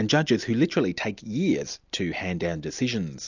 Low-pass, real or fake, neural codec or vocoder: 7.2 kHz; real; none